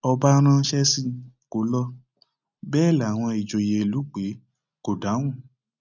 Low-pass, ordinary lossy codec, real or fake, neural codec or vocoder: 7.2 kHz; none; real; none